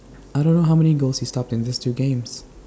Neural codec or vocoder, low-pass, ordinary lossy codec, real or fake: none; none; none; real